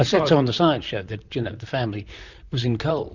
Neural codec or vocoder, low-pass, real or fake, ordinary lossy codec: vocoder, 44.1 kHz, 128 mel bands, Pupu-Vocoder; 7.2 kHz; fake; Opus, 64 kbps